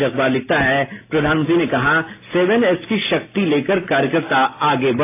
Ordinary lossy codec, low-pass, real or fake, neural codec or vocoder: AAC, 24 kbps; 3.6 kHz; real; none